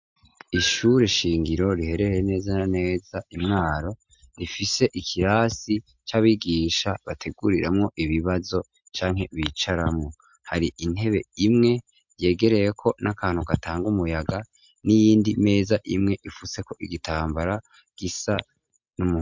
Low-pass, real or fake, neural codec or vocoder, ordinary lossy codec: 7.2 kHz; real; none; MP3, 64 kbps